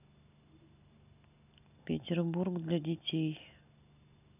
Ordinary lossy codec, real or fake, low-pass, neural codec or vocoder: none; real; 3.6 kHz; none